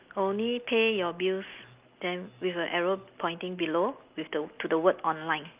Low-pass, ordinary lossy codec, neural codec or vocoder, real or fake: 3.6 kHz; Opus, 24 kbps; none; real